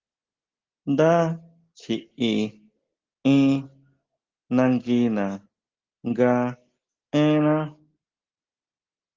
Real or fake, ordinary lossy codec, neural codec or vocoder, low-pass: real; Opus, 16 kbps; none; 7.2 kHz